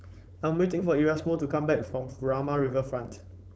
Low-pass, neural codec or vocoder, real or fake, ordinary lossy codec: none; codec, 16 kHz, 4.8 kbps, FACodec; fake; none